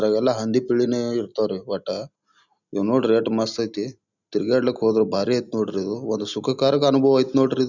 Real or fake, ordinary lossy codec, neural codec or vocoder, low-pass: real; none; none; 7.2 kHz